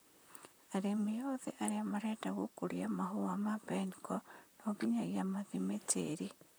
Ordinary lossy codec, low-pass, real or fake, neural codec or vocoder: none; none; fake; vocoder, 44.1 kHz, 128 mel bands, Pupu-Vocoder